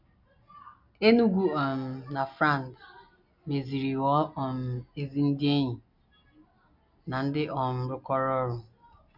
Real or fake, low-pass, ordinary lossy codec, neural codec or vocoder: real; 5.4 kHz; none; none